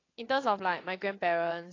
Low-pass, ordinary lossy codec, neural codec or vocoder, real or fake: 7.2 kHz; AAC, 32 kbps; vocoder, 22.05 kHz, 80 mel bands, WaveNeXt; fake